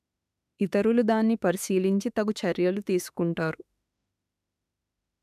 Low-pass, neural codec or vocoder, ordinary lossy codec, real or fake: 14.4 kHz; autoencoder, 48 kHz, 32 numbers a frame, DAC-VAE, trained on Japanese speech; none; fake